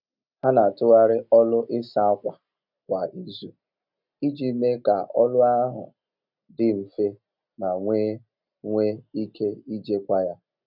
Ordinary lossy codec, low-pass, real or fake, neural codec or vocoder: none; 5.4 kHz; real; none